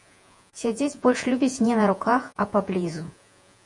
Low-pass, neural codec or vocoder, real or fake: 10.8 kHz; vocoder, 48 kHz, 128 mel bands, Vocos; fake